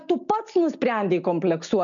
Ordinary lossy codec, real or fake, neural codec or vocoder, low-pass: MP3, 96 kbps; real; none; 7.2 kHz